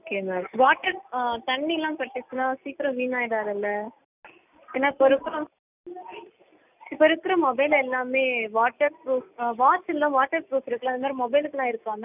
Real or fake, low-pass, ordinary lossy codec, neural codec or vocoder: real; 3.6 kHz; none; none